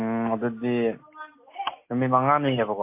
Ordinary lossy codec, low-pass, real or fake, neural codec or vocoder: MP3, 24 kbps; 3.6 kHz; real; none